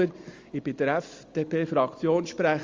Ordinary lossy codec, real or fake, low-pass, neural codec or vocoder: Opus, 32 kbps; real; 7.2 kHz; none